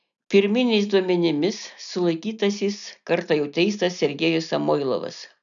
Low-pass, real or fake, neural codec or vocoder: 7.2 kHz; real; none